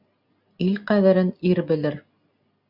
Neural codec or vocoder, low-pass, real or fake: none; 5.4 kHz; real